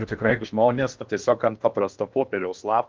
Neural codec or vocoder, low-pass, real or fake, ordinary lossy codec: codec, 16 kHz in and 24 kHz out, 0.8 kbps, FocalCodec, streaming, 65536 codes; 7.2 kHz; fake; Opus, 32 kbps